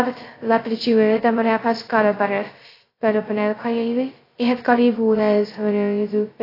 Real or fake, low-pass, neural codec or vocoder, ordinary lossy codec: fake; 5.4 kHz; codec, 16 kHz, 0.2 kbps, FocalCodec; AAC, 24 kbps